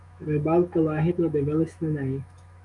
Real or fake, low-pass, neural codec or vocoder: fake; 10.8 kHz; autoencoder, 48 kHz, 128 numbers a frame, DAC-VAE, trained on Japanese speech